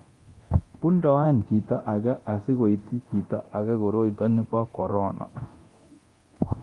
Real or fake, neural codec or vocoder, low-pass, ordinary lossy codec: fake; codec, 24 kHz, 0.9 kbps, DualCodec; 10.8 kHz; Opus, 24 kbps